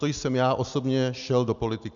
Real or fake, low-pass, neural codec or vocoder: real; 7.2 kHz; none